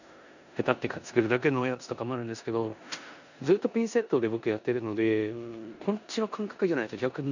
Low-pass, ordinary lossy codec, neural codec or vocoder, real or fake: 7.2 kHz; none; codec, 16 kHz in and 24 kHz out, 0.9 kbps, LongCat-Audio-Codec, four codebook decoder; fake